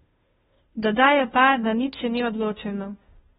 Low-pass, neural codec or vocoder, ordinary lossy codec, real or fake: 7.2 kHz; codec, 16 kHz, 0.5 kbps, FunCodec, trained on Chinese and English, 25 frames a second; AAC, 16 kbps; fake